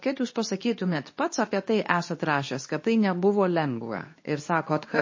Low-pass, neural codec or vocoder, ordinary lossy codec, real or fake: 7.2 kHz; codec, 24 kHz, 0.9 kbps, WavTokenizer, medium speech release version 2; MP3, 32 kbps; fake